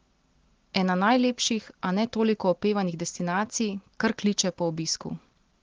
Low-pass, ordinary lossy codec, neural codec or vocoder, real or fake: 7.2 kHz; Opus, 16 kbps; none; real